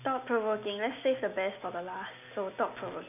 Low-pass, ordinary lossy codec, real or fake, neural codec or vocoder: 3.6 kHz; none; real; none